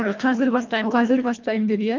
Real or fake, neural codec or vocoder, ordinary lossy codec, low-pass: fake; codec, 24 kHz, 1.5 kbps, HILCodec; Opus, 24 kbps; 7.2 kHz